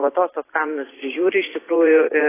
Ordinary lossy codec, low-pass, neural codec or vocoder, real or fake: AAC, 16 kbps; 3.6 kHz; vocoder, 44.1 kHz, 128 mel bands every 512 samples, BigVGAN v2; fake